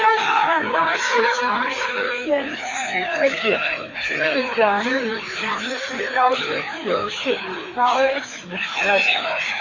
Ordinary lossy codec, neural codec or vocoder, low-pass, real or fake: AAC, 32 kbps; codec, 16 kHz, 2 kbps, FreqCodec, larger model; 7.2 kHz; fake